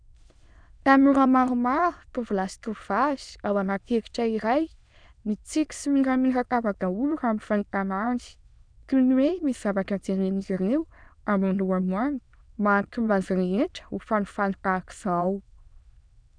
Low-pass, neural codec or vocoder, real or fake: 9.9 kHz; autoencoder, 22.05 kHz, a latent of 192 numbers a frame, VITS, trained on many speakers; fake